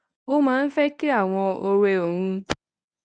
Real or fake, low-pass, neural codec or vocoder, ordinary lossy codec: fake; 9.9 kHz; codec, 24 kHz, 0.9 kbps, WavTokenizer, medium speech release version 1; none